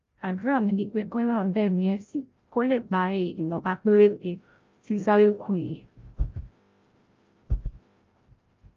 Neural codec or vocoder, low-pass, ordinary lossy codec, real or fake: codec, 16 kHz, 0.5 kbps, FreqCodec, larger model; 7.2 kHz; Opus, 32 kbps; fake